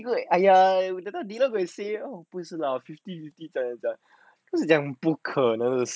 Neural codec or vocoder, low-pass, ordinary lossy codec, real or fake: none; none; none; real